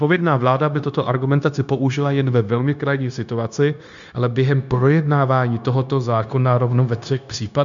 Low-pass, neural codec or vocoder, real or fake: 7.2 kHz; codec, 16 kHz, 0.9 kbps, LongCat-Audio-Codec; fake